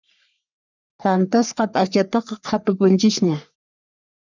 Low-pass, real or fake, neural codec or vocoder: 7.2 kHz; fake; codec, 44.1 kHz, 3.4 kbps, Pupu-Codec